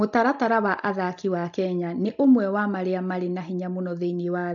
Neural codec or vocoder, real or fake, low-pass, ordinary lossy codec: none; real; 7.2 kHz; AAC, 64 kbps